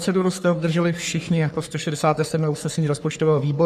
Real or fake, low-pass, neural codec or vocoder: fake; 14.4 kHz; codec, 44.1 kHz, 3.4 kbps, Pupu-Codec